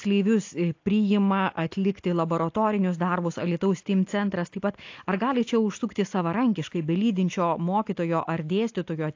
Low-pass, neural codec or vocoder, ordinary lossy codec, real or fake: 7.2 kHz; none; AAC, 48 kbps; real